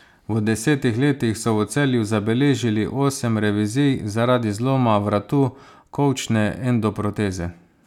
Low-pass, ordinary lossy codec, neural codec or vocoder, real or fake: 19.8 kHz; none; none; real